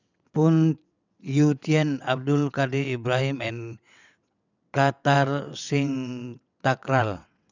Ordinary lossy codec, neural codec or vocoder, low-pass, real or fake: none; vocoder, 22.05 kHz, 80 mel bands, WaveNeXt; 7.2 kHz; fake